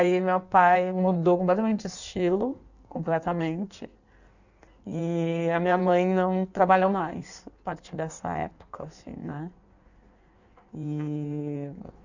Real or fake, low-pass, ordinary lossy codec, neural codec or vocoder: fake; 7.2 kHz; none; codec, 16 kHz in and 24 kHz out, 1.1 kbps, FireRedTTS-2 codec